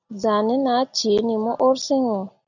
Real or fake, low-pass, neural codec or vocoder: real; 7.2 kHz; none